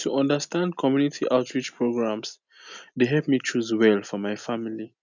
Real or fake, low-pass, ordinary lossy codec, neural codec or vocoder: real; 7.2 kHz; none; none